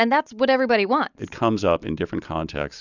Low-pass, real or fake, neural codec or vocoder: 7.2 kHz; real; none